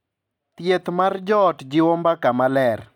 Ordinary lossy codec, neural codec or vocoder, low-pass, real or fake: none; none; 19.8 kHz; real